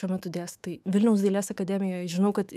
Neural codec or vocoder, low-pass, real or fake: autoencoder, 48 kHz, 128 numbers a frame, DAC-VAE, trained on Japanese speech; 14.4 kHz; fake